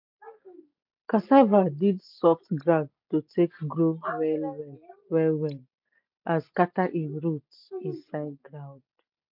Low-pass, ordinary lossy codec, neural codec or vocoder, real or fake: 5.4 kHz; MP3, 48 kbps; none; real